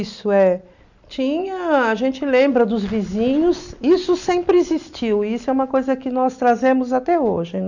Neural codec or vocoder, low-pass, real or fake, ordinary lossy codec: none; 7.2 kHz; real; none